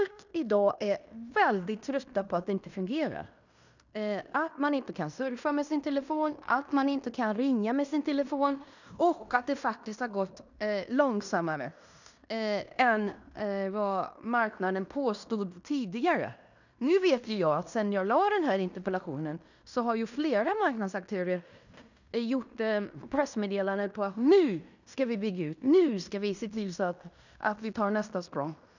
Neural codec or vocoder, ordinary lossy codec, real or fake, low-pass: codec, 16 kHz in and 24 kHz out, 0.9 kbps, LongCat-Audio-Codec, fine tuned four codebook decoder; none; fake; 7.2 kHz